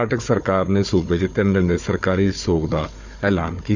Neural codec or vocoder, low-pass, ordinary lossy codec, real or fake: codec, 16 kHz, 4 kbps, FunCodec, trained on Chinese and English, 50 frames a second; 7.2 kHz; Opus, 64 kbps; fake